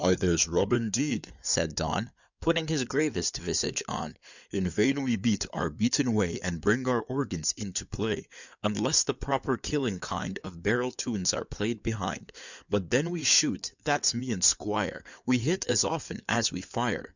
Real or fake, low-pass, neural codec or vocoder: fake; 7.2 kHz; codec, 16 kHz in and 24 kHz out, 2.2 kbps, FireRedTTS-2 codec